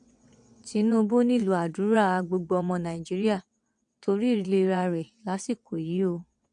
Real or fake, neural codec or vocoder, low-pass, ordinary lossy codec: fake; vocoder, 22.05 kHz, 80 mel bands, WaveNeXt; 9.9 kHz; MP3, 64 kbps